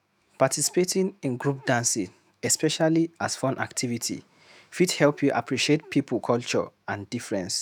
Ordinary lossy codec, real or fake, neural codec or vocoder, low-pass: none; fake; autoencoder, 48 kHz, 128 numbers a frame, DAC-VAE, trained on Japanese speech; none